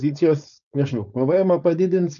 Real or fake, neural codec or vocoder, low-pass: fake; codec, 16 kHz, 4.8 kbps, FACodec; 7.2 kHz